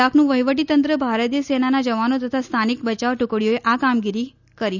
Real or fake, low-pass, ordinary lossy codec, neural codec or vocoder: real; 7.2 kHz; none; none